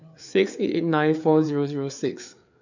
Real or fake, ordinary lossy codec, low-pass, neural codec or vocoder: fake; none; 7.2 kHz; codec, 16 kHz, 4 kbps, FreqCodec, larger model